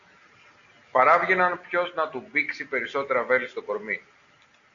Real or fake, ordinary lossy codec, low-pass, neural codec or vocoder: real; Opus, 64 kbps; 7.2 kHz; none